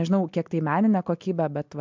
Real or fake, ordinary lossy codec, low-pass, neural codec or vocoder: real; MP3, 64 kbps; 7.2 kHz; none